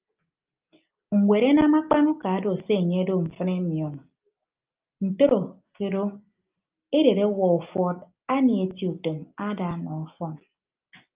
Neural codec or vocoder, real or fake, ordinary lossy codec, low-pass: none; real; Opus, 24 kbps; 3.6 kHz